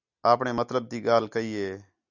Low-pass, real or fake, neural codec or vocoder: 7.2 kHz; real; none